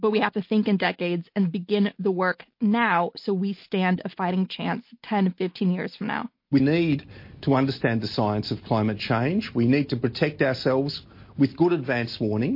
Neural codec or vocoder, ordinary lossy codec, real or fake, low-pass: none; MP3, 32 kbps; real; 5.4 kHz